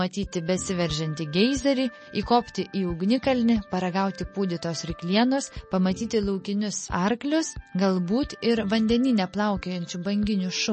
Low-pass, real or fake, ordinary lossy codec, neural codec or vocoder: 10.8 kHz; real; MP3, 32 kbps; none